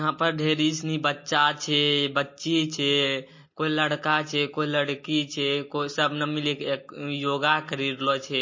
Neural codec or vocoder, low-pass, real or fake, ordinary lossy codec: none; 7.2 kHz; real; MP3, 32 kbps